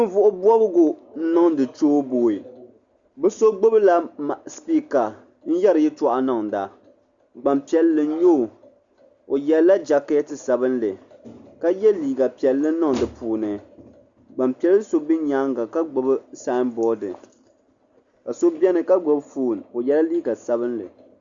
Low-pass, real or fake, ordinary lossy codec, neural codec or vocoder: 7.2 kHz; real; Opus, 64 kbps; none